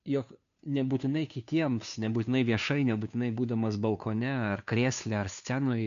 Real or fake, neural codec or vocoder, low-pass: fake; codec, 16 kHz, 2 kbps, FunCodec, trained on Chinese and English, 25 frames a second; 7.2 kHz